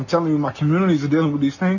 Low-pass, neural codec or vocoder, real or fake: 7.2 kHz; none; real